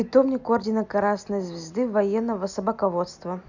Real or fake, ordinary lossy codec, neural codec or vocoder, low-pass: real; none; none; 7.2 kHz